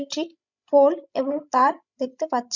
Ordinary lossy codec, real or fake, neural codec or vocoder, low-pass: none; fake; codec, 16 kHz, 16 kbps, FreqCodec, larger model; 7.2 kHz